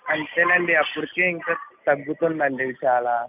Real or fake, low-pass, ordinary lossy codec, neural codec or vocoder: real; 3.6 kHz; none; none